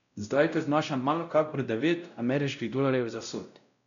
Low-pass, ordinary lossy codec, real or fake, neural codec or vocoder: 7.2 kHz; none; fake; codec, 16 kHz, 0.5 kbps, X-Codec, WavLM features, trained on Multilingual LibriSpeech